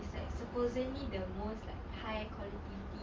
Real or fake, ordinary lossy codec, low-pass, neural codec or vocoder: real; Opus, 32 kbps; 7.2 kHz; none